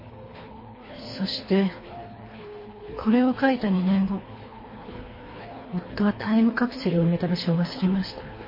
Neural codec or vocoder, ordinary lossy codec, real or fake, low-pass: codec, 24 kHz, 3 kbps, HILCodec; MP3, 24 kbps; fake; 5.4 kHz